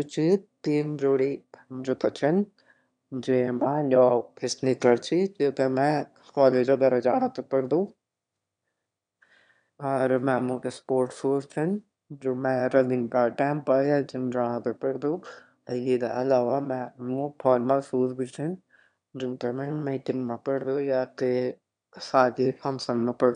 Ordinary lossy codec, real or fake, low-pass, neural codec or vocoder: none; fake; 9.9 kHz; autoencoder, 22.05 kHz, a latent of 192 numbers a frame, VITS, trained on one speaker